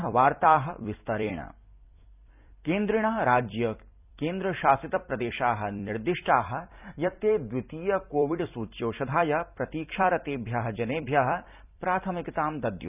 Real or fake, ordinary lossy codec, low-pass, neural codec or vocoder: fake; none; 3.6 kHz; vocoder, 44.1 kHz, 128 mel bands every 256 samples, BigVGAN v2